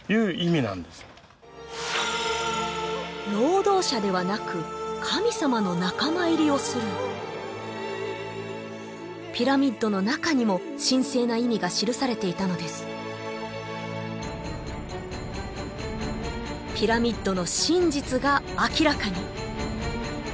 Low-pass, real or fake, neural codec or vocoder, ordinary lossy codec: none; real; none; none